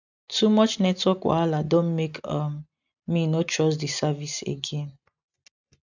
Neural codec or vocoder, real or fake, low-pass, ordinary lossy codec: none; real; 7.2 kHz; none